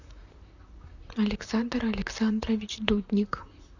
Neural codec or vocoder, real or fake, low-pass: vocoder, 44.1 kHz, 128 mel bands, Pupu-Vocoder; fake; 7.2 kHz